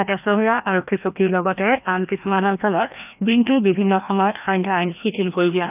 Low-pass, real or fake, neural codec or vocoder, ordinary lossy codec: 3.6 kHz; fake; codec, 16 kHz, 1 kbps, FreqCodec, larger model; none